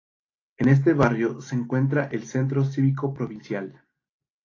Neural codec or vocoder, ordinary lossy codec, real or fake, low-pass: none; AAC, 32 kbps; real; 7.2 kHz